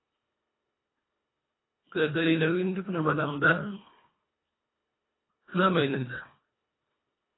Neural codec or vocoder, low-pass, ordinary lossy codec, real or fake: codec, 24 kHz, 1.5 kbps, HILCodec; 7.2 kHz; AAC, 16 kbps; fake